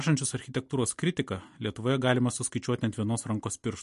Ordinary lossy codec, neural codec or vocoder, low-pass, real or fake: MP3, 48 kbps; none; 14.4 kHz; real